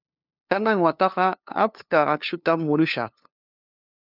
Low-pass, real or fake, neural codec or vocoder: 5.4 kHz; fake; codec, 16 kHz, 2 kbps, FunCodec, trained on LibriTTS, 25 frames a second